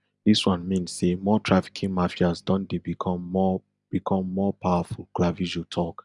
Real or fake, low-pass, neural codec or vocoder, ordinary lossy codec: real; 10.8 kHz; none; none